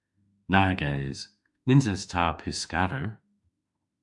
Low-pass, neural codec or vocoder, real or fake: 10.8 kHz; autoencoder, 48 kHz, 32 numbers a frame, DAC-VAE, trained on Japanese speech; fake